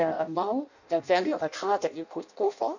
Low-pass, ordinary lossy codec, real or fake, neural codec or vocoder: 7.2 kHz; none; fake; codec, 16 kHz in and 24 kHz out, 0.6 kbps, FireRedTTS-2 codec